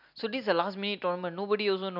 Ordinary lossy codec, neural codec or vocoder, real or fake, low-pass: none; none; real; 5.4 kHz